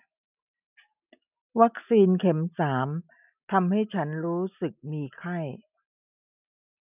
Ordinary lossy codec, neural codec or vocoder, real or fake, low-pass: none; none; real; 3.6 kHz